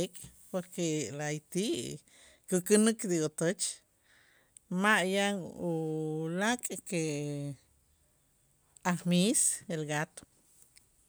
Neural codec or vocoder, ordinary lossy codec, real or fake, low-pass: none; none; real; none